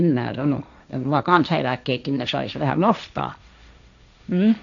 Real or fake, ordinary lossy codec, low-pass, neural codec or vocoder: fake; none; 7.2 kHz; codec, 16 kHz, 1.1 kbps, Voila-Tokenizer